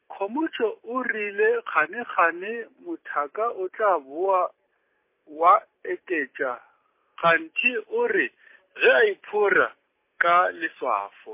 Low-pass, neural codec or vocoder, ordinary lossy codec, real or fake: 3.6 kHz; none; MP3, 24 kbps; real